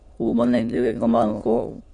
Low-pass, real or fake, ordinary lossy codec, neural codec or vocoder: 9.9 kHz; fake; MP3, 64 kbps; autoencoder, 22.05 kHz, a latent of 192 numbers a frame, VITS, trained on many speakers